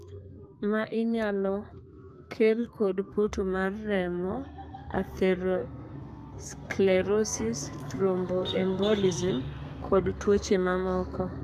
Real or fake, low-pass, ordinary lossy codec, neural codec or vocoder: fake; 14.4 kHz; AAC, 96 kbps; codec, 32 kHz, 1.9 kbps, SNAC